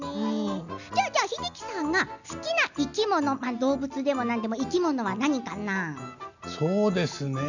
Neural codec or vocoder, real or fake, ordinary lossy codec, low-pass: none; real; none; 7.2 kHz